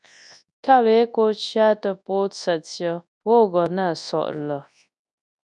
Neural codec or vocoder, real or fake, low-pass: codec, 24 kHz, 0.9 kbps, WavTokenizer, large speech release; fake; 10.8 kHz